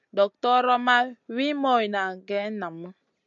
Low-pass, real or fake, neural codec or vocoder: 7.2 kHz; real; none